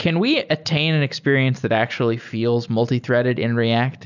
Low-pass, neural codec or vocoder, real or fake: 7.2 kHz; none; real